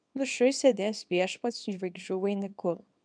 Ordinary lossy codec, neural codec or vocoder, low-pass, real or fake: MP3, 96 kbps; codec, 24 kHz, 0.9 kbps, WavTokenizer, small release; 9.9 kHz; fake